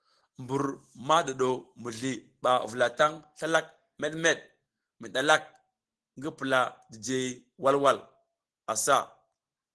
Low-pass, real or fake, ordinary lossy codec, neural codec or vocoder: 10.8 kHz; real; Opus, 16 kbps; none